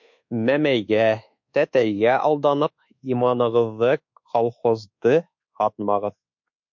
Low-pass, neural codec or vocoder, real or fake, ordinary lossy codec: 7.2 kHz; codec, 24 kHz, 1.2 kbps, DualCodec; fake; MP3, 48 kbps